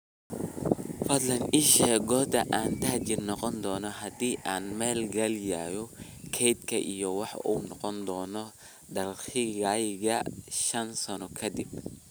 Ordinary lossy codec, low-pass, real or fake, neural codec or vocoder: none; none; real; none